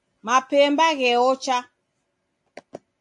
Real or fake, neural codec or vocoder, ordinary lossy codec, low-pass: real; none; AAC, 64 kbps; 10.8 kHz